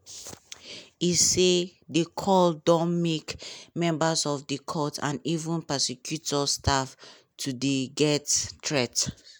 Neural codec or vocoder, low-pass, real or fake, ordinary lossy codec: none; none; real; none